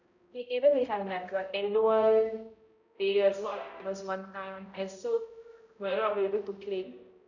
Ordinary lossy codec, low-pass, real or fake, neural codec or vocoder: none; 7.2 kHz; fake; codec, 16 kHz, 0.5 kbps, X-Codec, HuBERT features, trained on balanced general audio